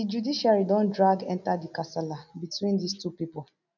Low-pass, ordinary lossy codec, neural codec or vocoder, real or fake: 7.2 kHz; none; none; real